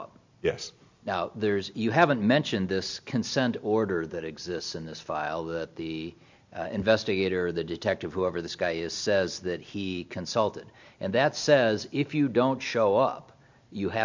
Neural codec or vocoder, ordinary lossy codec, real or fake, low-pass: none; MP3, 64 kbps; real; 7.2 kHz